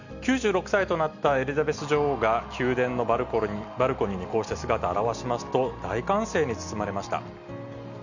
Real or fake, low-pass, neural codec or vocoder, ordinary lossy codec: real; 7.2 kHz; none; none